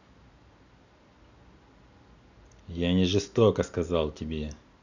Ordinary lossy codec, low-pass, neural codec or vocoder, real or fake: none; 7.2 kHz; none; real